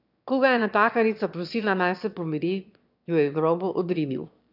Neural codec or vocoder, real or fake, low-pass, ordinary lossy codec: autoencoder, 22.05 kHz, a latent of 192 numbers a frame, VITS, trained on one speaker; fake; 5.4 kHz; none